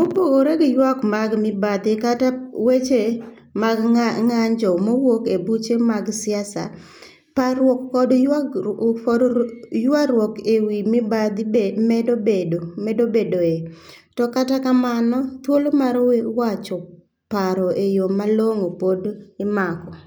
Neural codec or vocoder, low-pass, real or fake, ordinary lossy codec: none; none; real; none